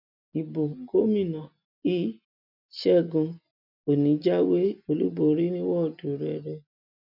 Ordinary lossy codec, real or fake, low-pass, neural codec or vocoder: none; real; 5.4 kHz; none